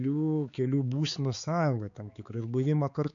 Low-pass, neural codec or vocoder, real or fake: 7.2 kHz; codec, 16 kHz, 4 kbps, X-Codec, HuBERT features, trained on balanced general audio; fake